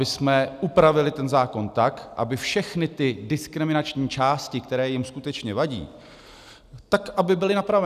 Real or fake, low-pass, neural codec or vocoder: real; 14.4 kHz; none